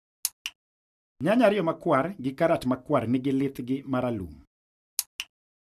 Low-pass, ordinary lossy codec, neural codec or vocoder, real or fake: 14.4 kHz; none; none; real